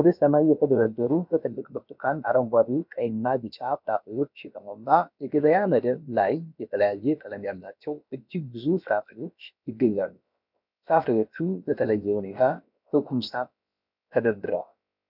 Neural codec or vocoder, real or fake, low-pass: codec, 16 kHz, about 1 kbps, DyCAST, with the encoder's durations; fake; 5.4 kHz